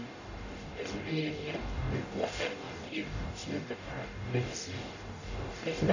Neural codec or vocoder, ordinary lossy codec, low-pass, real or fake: codec, 44.1 kHz, 0.9 kbps, DAC; none; 7.2 kHz; fake